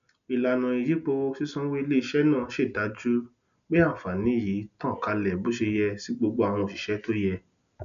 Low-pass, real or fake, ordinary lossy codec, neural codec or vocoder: 7.2 kHz; real; none; none